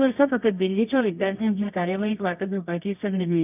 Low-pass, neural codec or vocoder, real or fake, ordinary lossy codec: 3.6 kHz; codec, 24 kHz, 0.9 kbps, WavTokenizer, medium music audio release; fake; none